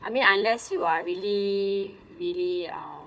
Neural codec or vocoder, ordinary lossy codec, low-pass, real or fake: codec, 16 kHz, 4 kbps, FunCodec, trained on Chinese and English, 50 frames a second; none; none; fake